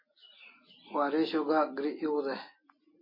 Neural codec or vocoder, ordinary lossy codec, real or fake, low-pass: none; MP3, 24 kbps; real; 5.4 kHz